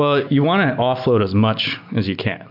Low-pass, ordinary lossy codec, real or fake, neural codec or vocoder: 5.4 kHz; MP3, 48 kbps; real; none